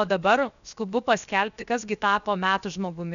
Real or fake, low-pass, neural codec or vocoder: fake; 7.2 kHz; codec, 16 kHz, 0.7 kbps, FocalCodec